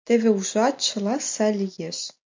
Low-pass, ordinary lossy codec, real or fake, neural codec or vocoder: 7.2 kHz; MP3, 64 kbps; real; none